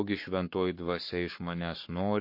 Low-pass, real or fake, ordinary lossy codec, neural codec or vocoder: 5.4 kHz; fake; MP3, 32 kbps; codec, 44.1 kHz, 7.8 kbps, Pupu-Codec